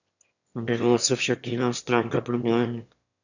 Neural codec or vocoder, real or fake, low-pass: autoencoder, 22.05 kHz, a latent of 192 numbers a frame, VITS, trained on one speaker; fake; 7.2 kHz